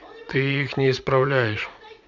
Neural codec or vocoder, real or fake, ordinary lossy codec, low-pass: vocoder, 44.1 kHz, 80 mel bands, Vocos; fake; none; 7.2 kHz